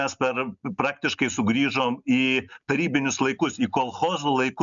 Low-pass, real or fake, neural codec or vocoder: 7.2 kHz; real; none